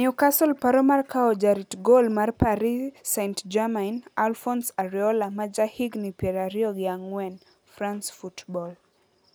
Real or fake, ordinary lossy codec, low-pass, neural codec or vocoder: real; none; none; none